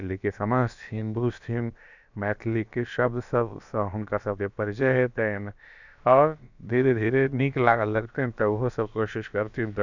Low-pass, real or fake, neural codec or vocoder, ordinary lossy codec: 7.2 kHz; fake; codec, 16 kHz, about 1 kbps, DyCAST, with the encoder's durations; none